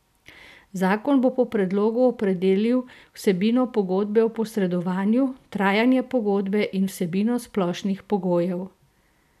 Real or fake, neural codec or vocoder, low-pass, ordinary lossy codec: real; none; 14.4 kHz; none